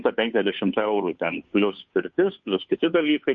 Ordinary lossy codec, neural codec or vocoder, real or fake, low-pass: MP3, 48 kbps; codec, 16 kHz, 2 kbps, FunCodec, trained on Chinese and English, 25 frames a second; fake; 7.2 kHz